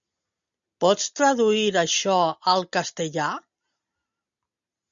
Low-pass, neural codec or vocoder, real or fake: 7.2 kHz; none; real